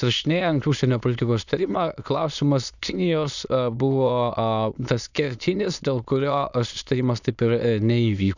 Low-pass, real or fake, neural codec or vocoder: 7.2 kHz; fake; autoencoder, 22.05 kHz, a latent of 192 numbers a frame, VITS, trained on many speakers